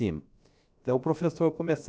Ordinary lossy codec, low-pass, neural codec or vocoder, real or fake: none; none; codec, 16 kHz, about 1 kbps, DyCAST, with the encoder's durations; fake